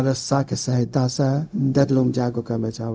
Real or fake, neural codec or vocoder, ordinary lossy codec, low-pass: fake; codec, 16 kHz, 0.4 kbps, LongCat-Audio-Codec; none; none